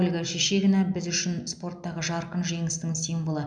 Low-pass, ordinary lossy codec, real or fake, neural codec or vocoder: 9.9 kHz; none; real; none